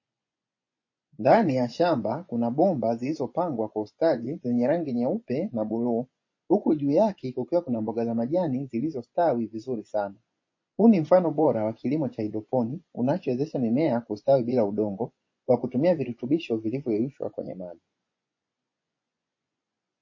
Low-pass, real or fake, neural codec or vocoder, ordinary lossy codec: 7.2 kHz; fake; vocoder, 24 kHz, 100 mel bands, Vocos; MP3, 32 kbps